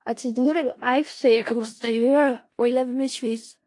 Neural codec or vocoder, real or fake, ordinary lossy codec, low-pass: codec, 16 kHz in and 24 kHz out, 0.4 kbps, LongCat-Audio-Codec, four codebook decoder; fake; AAC, 48 kbps; 10.8 kHz